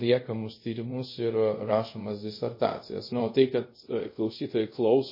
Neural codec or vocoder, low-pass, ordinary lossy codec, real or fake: codec, 24 kHz, 0.5 kbps, DualCodec; 5.4 kHz; MP3, 24 kbps; fake